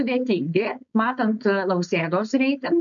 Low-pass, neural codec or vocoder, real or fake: 7.2 kHz; codec, 16 kHz, 4.8 kbps, FACodec; fake